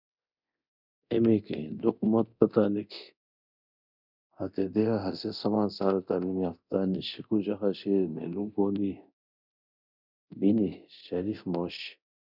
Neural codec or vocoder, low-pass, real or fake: codec, 24 kHz, 0.9 kbps, DualCodec; 5.4 kHz; fake